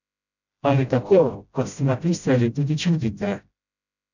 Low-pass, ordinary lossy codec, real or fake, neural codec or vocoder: 7.2 kHz; none; fake; codec, 16 kHz, 0.5 kbps, FreqCodec, smaller model